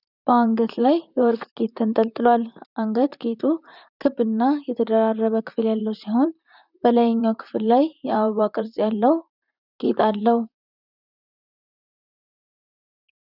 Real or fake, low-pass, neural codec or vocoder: fake; 5.4 kHz; vocoder, 44.1 kHz, 128 mel bands, Pupu-Vocoder